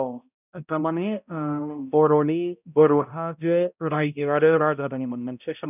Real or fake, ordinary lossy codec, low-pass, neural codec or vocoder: fake; none; 3.6 kHz; codec, 16 kHz, 0.5 kbps, X-Codec, HuBERT features, trained on balanced general audio